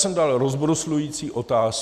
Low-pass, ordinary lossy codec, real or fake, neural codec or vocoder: 14.4 kHz; Opus, 64 kbps; real; none